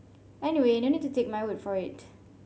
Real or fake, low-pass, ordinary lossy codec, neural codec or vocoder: real; none; none; none